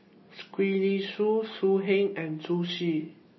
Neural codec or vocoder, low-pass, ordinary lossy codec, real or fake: none; 7.2 kHz; MP3, 24 kbps; real